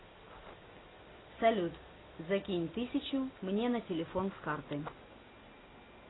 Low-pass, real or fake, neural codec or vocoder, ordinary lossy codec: 7.2 kHz; real; none; AAC, 16 kbps